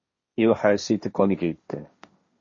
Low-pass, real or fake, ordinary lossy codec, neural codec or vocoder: 7.2 kHz; fake; MP3, 32 kbps; codec, 16 kHz, 1.1 kbps, Voila-Tokenizer